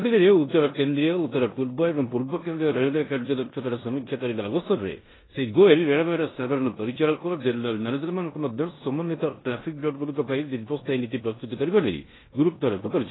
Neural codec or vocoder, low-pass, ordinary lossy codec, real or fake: codec, 16 kHz in and 24 kHz out, 0.9 kbps, LongCat-Audio-Codec, four codebook decoder; 7.2 kHz; AAC, 16 kbps; fake